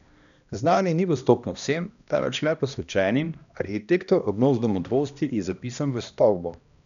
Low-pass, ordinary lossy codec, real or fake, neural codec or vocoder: 7.2 kHz; MP3, 96 kbps; fake; codec, 16 kHz, 1 kbps, X-Codec, HuBERT features, trained on balanced general audio